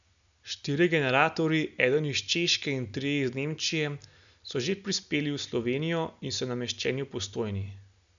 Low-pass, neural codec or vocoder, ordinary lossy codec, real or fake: 7.2 kHz; none; none; real